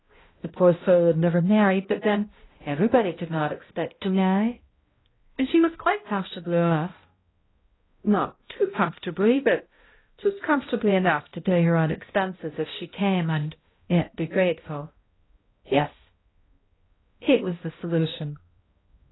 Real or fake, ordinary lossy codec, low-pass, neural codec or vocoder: fake; AAC, 16 kbps; 7.2 kHz; codec, 16 kHz, 0.5 kbps, X-Codec, HuBERT features, trained on balanced general audio